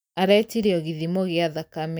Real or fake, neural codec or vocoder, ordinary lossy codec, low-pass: real; none; none; none